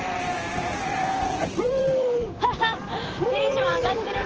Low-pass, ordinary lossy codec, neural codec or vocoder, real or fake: 7.2 kHz; Opus, 16 kbps; vocoder, 44.1 kHz, 128 mel bands, Pupu-Vocoder; fake